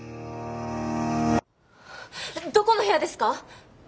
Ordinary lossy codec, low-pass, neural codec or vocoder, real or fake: none; none; none; real